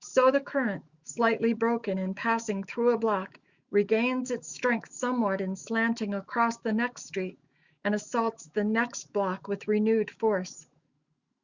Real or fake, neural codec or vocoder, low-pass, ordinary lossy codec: fake; autoencoder, 48 kHz, 128 numbers a frame, DAC-VAE, trained on Japanese speech; 7.2 kHz; Opus, 64 kbps